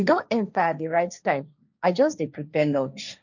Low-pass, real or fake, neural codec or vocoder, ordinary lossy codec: 7.2 kHz; fake; codec, 16 kHz, 1.1 kbps, Voila-Tokenizer; none